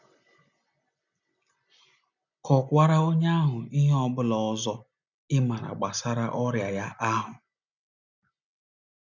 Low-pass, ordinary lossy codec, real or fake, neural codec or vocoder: 7.2 kHz; none; real; none